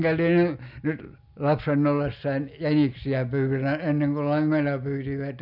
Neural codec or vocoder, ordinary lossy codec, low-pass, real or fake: none; none; 5.4 kHz; real